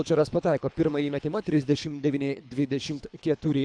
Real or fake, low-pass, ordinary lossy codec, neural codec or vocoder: fake; 9.9 kHz; AAC, 64 kbps; codec, 24 kHz, 3 kbps, HILCodec